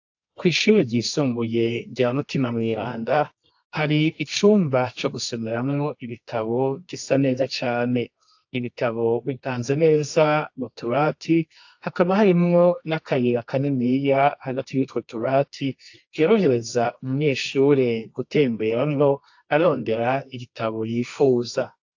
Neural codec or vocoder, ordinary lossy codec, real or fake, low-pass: codec, 24 kHz, 0.9 kbps, WavTokenizer, medium music audio release; AAC, 48 kbps; fake; 7.2 kHz